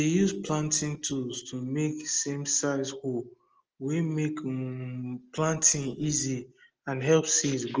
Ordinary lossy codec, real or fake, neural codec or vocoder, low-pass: Opus, 24 kbps; real; none; 7.2 kHz